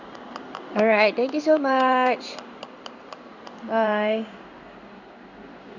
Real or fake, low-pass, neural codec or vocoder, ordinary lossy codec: fake; 7.2 kHz; codec, 16 kHz in and 24 kHz out, 1 kbps, XY-Tokenizer; none